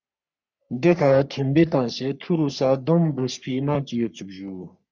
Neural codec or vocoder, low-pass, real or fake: codec, 44.1 kHz, 3.4 kbps, Pupu-Codec; 7.2 kHz; fake